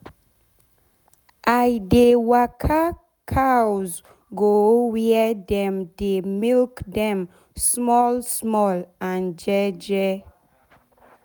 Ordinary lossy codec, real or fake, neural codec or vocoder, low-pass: none; real; none; none